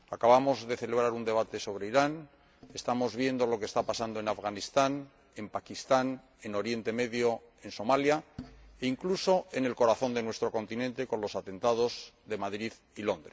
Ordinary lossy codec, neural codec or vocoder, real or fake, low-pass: none; none; real; none